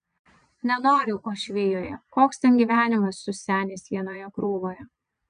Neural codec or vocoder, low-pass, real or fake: vocoder, 22.05 kHz, 80 mel bands, Vocos; 9.9 kHz; fake